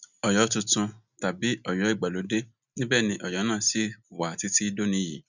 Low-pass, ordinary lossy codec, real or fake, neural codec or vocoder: 7.2 kHz; none; real; none